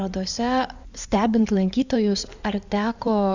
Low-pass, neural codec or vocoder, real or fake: 7.2 kHz; codec, 16 kHz in and 24 kHz out, 2.2 kbps, FireRedTTS-2 codec; fake